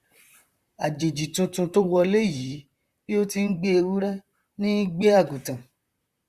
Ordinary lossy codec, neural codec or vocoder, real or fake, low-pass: Opus, 64 kbps; vocoder, 44.1 kHz, 128 mel bands, Pupu-Vocoder; fake; 14.4 kHz